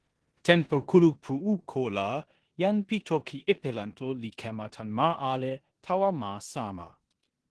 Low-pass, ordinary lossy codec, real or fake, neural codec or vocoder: 10.8 kHz; Opus, 16 kbps; fake; codec, 16 kHz in and 24 kHz out, 0.9 kbps, LongCat-Audio-Codec, four codebook decoder